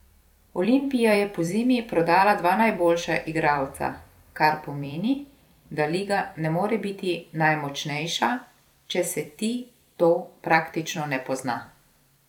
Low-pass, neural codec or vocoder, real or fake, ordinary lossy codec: 19.8 kHz; vocoder, 44.1 kHz, 128 mel bands every 256 samples, BigVGAN v2; fake; none